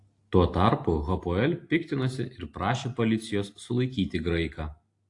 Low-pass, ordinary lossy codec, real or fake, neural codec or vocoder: 10.8 kHz; AAC, 48 kbps; real; none